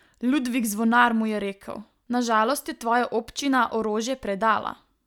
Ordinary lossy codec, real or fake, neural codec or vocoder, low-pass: none; real; none; 19.8 kHz